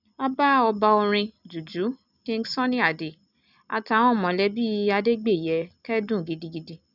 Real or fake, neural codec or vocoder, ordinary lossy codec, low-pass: real; none; none; 5.4 kHz